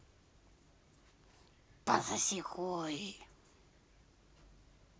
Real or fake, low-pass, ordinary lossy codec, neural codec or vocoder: real; none; none; none